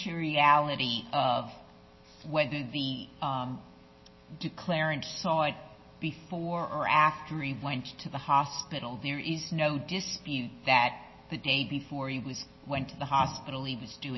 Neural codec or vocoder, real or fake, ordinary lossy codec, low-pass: none; real; MP3, 24 kbps; 7.2 kHz